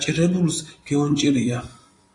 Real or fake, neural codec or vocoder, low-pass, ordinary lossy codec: fake; vocoder, 44.1 kHz, 128 mel bands every 256 samples, BigVGAN v2; 10.8 kHz; AAC, 48 kbps